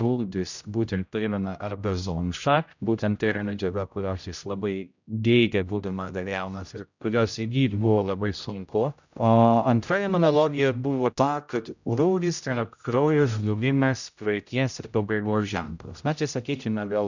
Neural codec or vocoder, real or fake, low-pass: codec, 16 kHz, 0.5 kbps, X-Codec, HuBERT features, trained on general audio; fake; 7.2 kHz